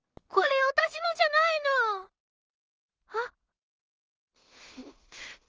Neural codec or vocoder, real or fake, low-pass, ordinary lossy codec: codec, 16 kHz in and 24 kHz out, 0.4 kbps, LongCat-Audio-Codec, two codebook decoder; fake; 7.2 kHz; Opus, 24 kbps